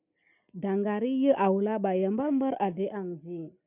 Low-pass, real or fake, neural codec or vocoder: 3.6 kHz; real; none